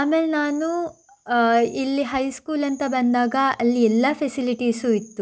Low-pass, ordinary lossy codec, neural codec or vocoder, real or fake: none; none; none; real